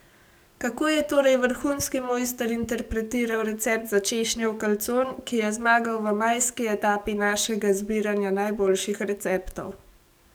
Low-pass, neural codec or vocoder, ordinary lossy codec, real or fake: none; codec, 44.1 kHz, 7.8 kbps, DAC; none; fake